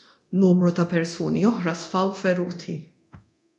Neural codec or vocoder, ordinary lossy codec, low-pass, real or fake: codec, 24 kHz, 0.9 kbps, DualCodec; MP3, 96 kbps; 10.8 kHz; fake